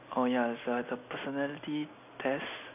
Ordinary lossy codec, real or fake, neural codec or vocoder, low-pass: none; real; none; 3.6 kHz